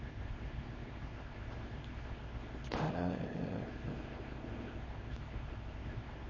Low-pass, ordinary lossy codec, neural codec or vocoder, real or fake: 7.2 kHz; MP3, 32 kbps; codec, 24 kHz, 0.9 kbps, WavTokenizer, small release; fake